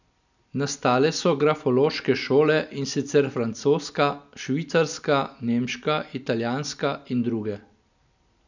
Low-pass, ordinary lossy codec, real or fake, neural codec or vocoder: 7.2 kHz; none; real; none